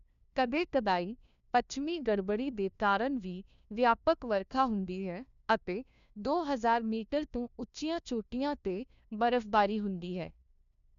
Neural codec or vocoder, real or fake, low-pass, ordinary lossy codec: codec, 16 kHz, 1 kbps, FunCodec, trained on LibriTTS, 50 frames a second; fake; 7.2 kHz; none